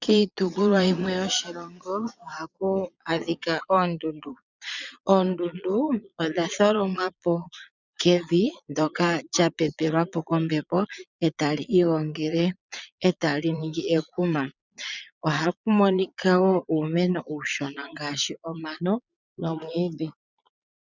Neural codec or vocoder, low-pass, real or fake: vocoder, 22.05 kHz, 80 mel bands, Vocos; 7.2 kHz; fake